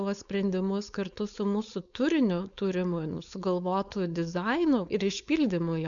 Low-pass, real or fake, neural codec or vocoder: 7.2 kHz; fake; codec, 16 kHz, 4.8 kbps, FACodec